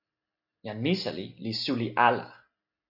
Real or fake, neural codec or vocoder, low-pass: real; none; 5.4 kHz